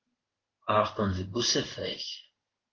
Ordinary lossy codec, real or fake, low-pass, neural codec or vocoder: Opus, 16 kbps; fake; 7.2 kHz; codec, 16 kHz in and 24 kHz out, 1 kbps, XY-Tokenizer